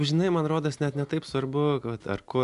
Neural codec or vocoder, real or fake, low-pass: none; real; 10.8 kHz